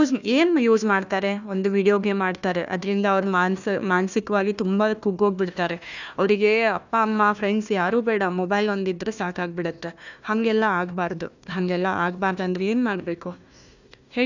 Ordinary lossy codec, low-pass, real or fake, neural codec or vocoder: none; 7.2 kHz; fake; codec, 16 kHz, 1 kbps, FunCodec, trained on Chinese and English, 50 frames a second